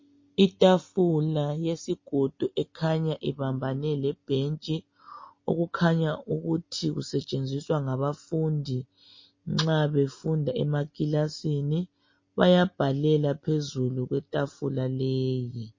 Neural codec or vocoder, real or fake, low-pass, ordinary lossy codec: none; real; 7.2 kHz; MP3, 32 kbps